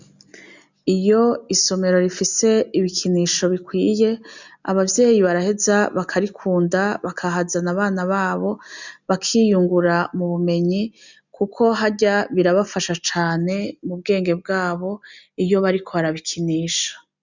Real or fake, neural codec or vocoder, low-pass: real; none; 7.2 kHz